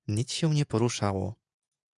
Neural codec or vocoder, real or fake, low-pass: vocoder, 48 kHz, 128 mel bands, Vocos; fake; 10.8 kHz